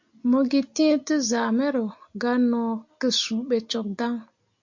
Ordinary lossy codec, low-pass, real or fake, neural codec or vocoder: MP3, 48 kbps; 7.2 kHz; real; none